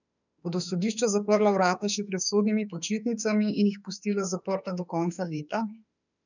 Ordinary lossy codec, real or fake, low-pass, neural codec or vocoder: none; fake; 7.2 kHz; autoencoder, 48 kHz, 32 numbers a frame, DAC-VAE, trained on Japanese speech